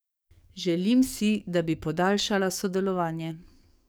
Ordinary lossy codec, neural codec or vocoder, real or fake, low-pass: none; codec, 44.1 kHz, 7.8 kbps, DAC; fake; none